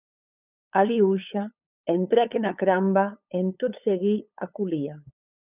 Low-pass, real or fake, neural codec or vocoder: 3.6 kHz; fake; codec, 16 kHz, 8 kbps, FunCodec, trained on LibriTTS, 25 frames a second